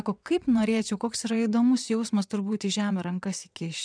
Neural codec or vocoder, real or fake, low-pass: vocoder, 22.05 kHz, 80 mel bands, WaveNeXt; fake; 9.9 kHz